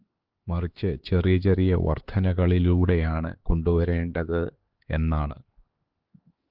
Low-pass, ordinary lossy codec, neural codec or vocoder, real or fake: 5.4 kHz; Opus, 16 kbps; codec, 16 kHz, 4 kbps, X-Codec, HuBERT features, trained on LibriSpeech; fake